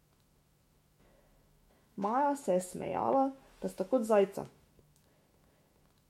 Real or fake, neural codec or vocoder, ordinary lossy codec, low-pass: fake; autoencoder, 48 kHz, 128 numbers a frame, DAC-VAE, trained on Japanese speech; MP3, 64 kbps; 19.8 kHz